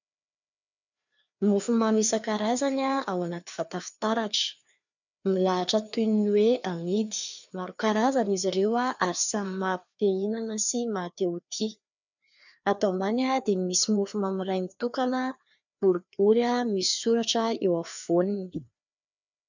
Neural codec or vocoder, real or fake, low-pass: codec, 16 kHz, 2 kbps, FreqCodec, larger model; fake; 7.2 kHz